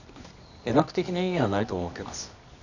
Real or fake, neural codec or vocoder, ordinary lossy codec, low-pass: fake; codec, 24 kHz, 0.9 kbps, WavTokenizer, medium music audio release; none; 7.2 kHz